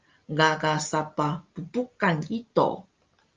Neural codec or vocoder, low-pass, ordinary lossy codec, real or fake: none; 7.2 kHz; Opus, 32 kbps; real